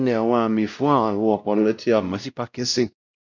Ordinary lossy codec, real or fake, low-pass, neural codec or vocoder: none; fake; 7.2 kHz; codec, 16 kHz, 0.5 kbps, X-Codec, WavLM features, trained on Multilingual LibriSpeech